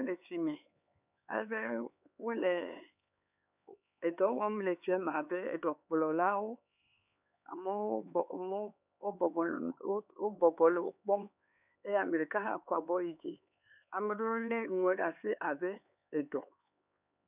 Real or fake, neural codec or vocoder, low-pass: fake; codec, 16 kHz, 4 kbps, X-Codec, HuBERT features, trained on LibriSpeech; 3.6 kHz